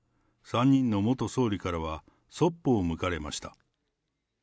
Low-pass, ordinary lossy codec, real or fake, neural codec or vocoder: none; none; real; none